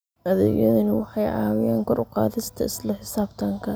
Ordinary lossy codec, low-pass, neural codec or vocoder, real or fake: none; none; none; real